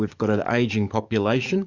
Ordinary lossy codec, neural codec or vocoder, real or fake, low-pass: Opus, 64 kbps; codec, 44.1 kHz, 7.8 kbps, DAC; fake; 7.2 kHz